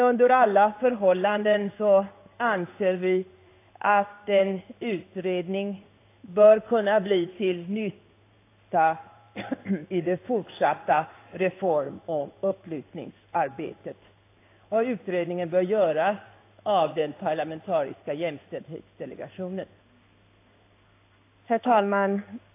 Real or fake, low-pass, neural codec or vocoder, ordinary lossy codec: fake; 3.6 kHz; codec, 16 kHz in and 24 kHz out, 1 kbps, XY-Tokenizer; AAC, 24 kbps